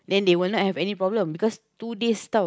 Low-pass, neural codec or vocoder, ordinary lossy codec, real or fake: none; none; none; real